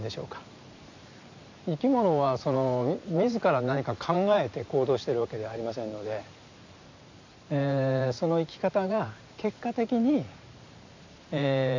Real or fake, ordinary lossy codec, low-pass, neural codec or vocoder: fake; AAC, 48 kbps; 7.2 kHz; vocoder, 44.1 kHz, 128 mel bands every 512 samples, BigVGAN v2